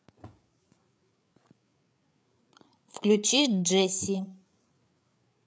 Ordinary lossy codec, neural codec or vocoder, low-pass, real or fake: none; codec, 16 kHz, 8 kbps, FreqCodec, larger model; none; fake